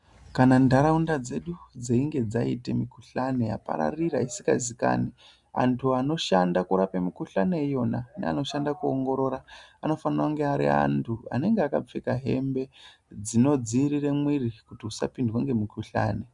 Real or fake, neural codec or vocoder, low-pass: real; none; 10.8 kHz